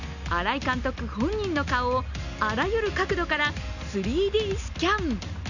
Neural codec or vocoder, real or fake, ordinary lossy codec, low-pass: none; real; none; 7.2 kHz